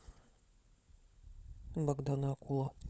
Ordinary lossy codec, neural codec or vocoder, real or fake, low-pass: none; codec, 16 kHz, 16 kbps, FunCodec, trained on LibriTTS, 50 frames a second; fake; none